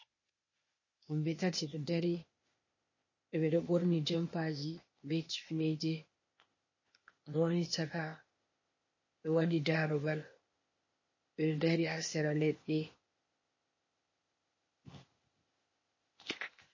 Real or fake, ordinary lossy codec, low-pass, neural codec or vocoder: fake; MP3, 32 kbps; 7.2 kHz; codec, 16 kHz, 0.8 kbps, ZipCodec